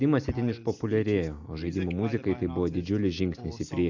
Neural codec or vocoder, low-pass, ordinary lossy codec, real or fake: none; 7.2 kHz; AAC, 48 kbps; real